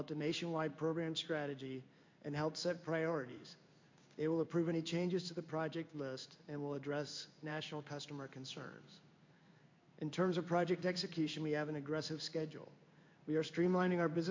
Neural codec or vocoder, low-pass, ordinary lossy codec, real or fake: codec, 16 kHz in and 24 kHz out, 1 kbps, XY-Tokenizer; 7.2 kHz; MP3, 48 kbps; fake